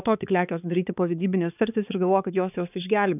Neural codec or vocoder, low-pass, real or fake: codec, 16 kHz, 4 kbps, X-Codec, HuBERT features, trained on balanced general audio; 3.6 kHz; fake